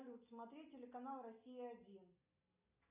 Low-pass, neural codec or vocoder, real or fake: 3.6 kHz; none; real